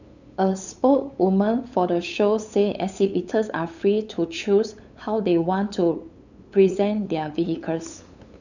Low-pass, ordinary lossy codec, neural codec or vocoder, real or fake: 7.2 kHz; none; codec, 16 kHz, 8 kbps, FunCodec, trained on LibriTTS, 25 frames a second; fake